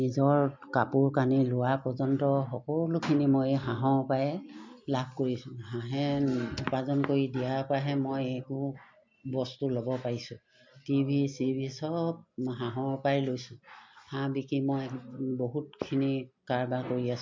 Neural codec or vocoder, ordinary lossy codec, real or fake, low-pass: none; MP3, 64 kbps; real; 7.2 kHz